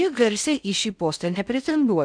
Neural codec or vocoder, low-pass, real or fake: codec, 16 kHz in and 24 kHz out, 0.6 kbps, FocalCodec, streaming, 4096 codes; 9.9 kHz; fake